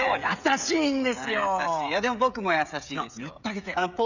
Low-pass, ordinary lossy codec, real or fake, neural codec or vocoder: 7.2 kHz; none; fake; codec, 16 kHz, 16 kbps, FreqCodec, smaller model